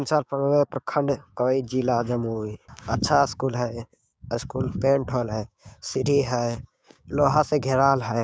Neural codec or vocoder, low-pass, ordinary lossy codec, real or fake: codec, 16 kHz, 6 kbps, DAC; none; none; fake